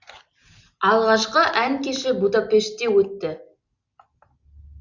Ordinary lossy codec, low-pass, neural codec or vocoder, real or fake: Opus, 64 kbps; 7.2 kHz; none; real